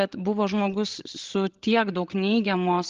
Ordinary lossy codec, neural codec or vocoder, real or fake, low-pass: Opus, 16 kbps; codec, 16 kHz, 16 kbps, FreqCodec, larger model; fake; 7.2 kHz